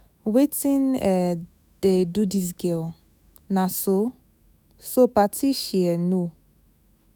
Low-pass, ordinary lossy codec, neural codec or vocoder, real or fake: none; none; autoencoder, 48 kHz, 128 numbers a frame, DAC-VAE, trained on Japanese speech; fake